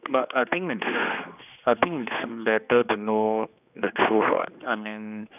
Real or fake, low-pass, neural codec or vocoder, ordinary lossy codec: fake; 3.6 kHz; codec, 16 kHz, 2 kbps, X-Codec, HuBERT features, trained on general audio; none